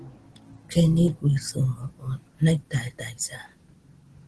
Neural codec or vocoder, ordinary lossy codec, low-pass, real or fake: none; Opus, 16 kbps; 10.8 kHz; real